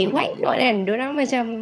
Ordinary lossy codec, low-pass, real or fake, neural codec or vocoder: none; none; fake; vocoder, 22.05 kHz, 80 mel bands, HiFi-GAN